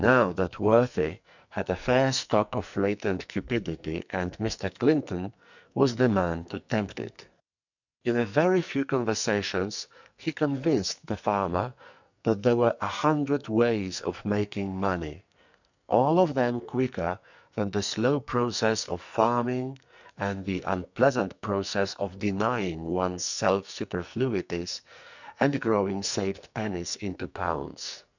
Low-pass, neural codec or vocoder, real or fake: 7.2 kHz; codec, 44.1 kHz, 2.6 kbps, SNAC; fake